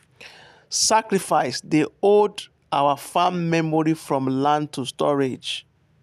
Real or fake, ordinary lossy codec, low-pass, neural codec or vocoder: real; none; 14.4 kHz; none